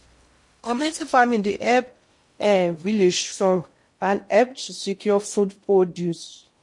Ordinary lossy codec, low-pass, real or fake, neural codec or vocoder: MP3, 48 kbps; 10.8 kHz; fake; codec, 16 kHz in and 24 kHz out, 0.6 kbps, FocalCodec, streaming, 2048 codes